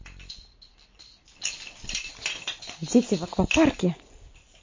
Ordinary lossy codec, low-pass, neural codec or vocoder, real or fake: MP3, 32 kbps; 7.2 kHz; none; real